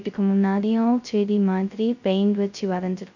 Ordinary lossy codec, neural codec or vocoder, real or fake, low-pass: none; codec, 16 kHz, 0.2 kbps, FocalCodec; fake; 7.2 kHz